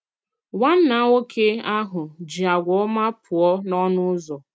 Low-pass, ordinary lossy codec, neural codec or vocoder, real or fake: none; none; none; real